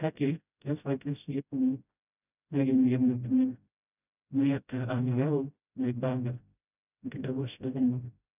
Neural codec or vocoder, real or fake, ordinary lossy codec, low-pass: codec, 16 kHz, 0.5 kbps, FreqCodec, smaller model; fake; none; 3.6 kHz